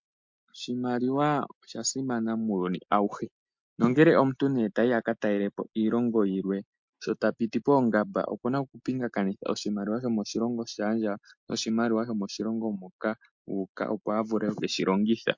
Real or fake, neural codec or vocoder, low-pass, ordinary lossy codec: real; none; 7.2 kHz; MP3, 48 kbps